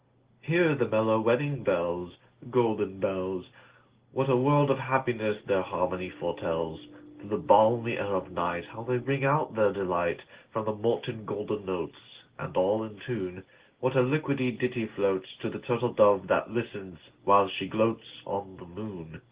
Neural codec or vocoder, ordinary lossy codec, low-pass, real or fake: none; Opus, 16 kbps; 3.6 kHz; real